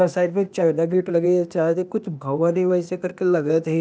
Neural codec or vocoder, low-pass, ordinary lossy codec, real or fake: codec, 16 kHz, 0.8 kbps, ZipCodec; none; none; fake